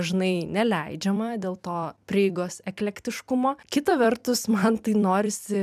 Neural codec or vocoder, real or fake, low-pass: vocoder, 44.1 kHz, 128 mel bands every 256 samples, BigVGAN v2; fake; 14.4 kHz